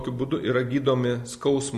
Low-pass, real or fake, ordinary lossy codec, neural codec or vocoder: 14.4 kHz; real; MP3, 64 kbps; none